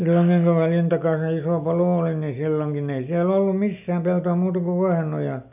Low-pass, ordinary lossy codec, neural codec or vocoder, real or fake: 3.6 kHz; none; none; real